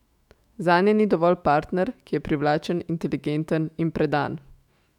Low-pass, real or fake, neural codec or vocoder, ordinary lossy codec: 19.8 kHz; fake; autoencoder, 48 kHz, 128 numbers a frame, DAC-VAE, trained on Japanese speech; none